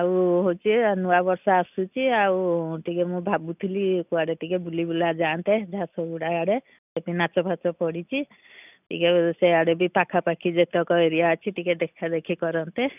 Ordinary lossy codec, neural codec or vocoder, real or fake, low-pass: none; none; real; 3.6 kHz